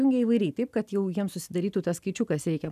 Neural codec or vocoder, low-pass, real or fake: none; 14.4 kHz; real